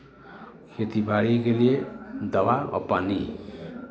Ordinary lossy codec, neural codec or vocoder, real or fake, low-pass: none; none; real; none